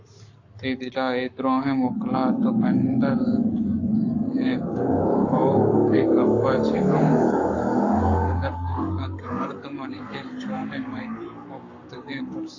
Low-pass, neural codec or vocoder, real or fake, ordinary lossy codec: 7.2 kHz; codec, 44.1 kHz, 7.8 kbps, Pupu-Codec; fake; AAC, 48 kbps